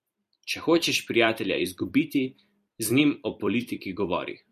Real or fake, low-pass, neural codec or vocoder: fake; 14.4 kHz; vocoder, 44.1 kHz, 128 mel bands every 256 samples, BigVGAN v2